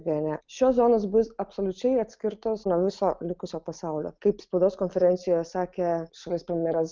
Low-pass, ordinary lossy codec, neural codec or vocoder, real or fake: 7.2 kHz; Opus, 24 kbps; none; real